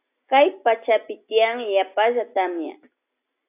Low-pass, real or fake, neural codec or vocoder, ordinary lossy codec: 3.6 kHz; real; none; AAC, 24 kbps